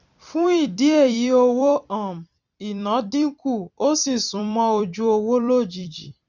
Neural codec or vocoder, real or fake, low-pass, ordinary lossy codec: vocoder, 44.1 kHz, 128 mel bands every 256 samples, BigVGAN v2; fake; 7.2 kHz; none